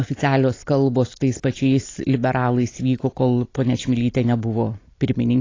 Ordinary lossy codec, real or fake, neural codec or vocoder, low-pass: AAC, 32 kbps; real; none; 7.2 kHz